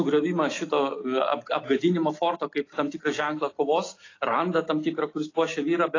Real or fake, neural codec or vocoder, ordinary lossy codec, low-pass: real; none; AAC, 32 kbps; 7.2 kHz